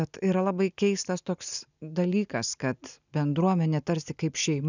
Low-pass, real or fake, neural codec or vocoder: 7.2 kHz; real; none